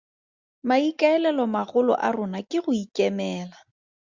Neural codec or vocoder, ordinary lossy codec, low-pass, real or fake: none; Opus, 64 kbps; 7.2 kHz; real